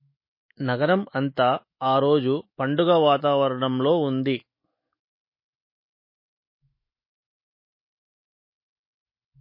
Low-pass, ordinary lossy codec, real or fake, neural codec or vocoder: 5.4 kHz; MP3, 24 kbps; fake; autoencoder, 48 kHz, 128 numbers a frame, DAC-VAE, trained on Japanese speech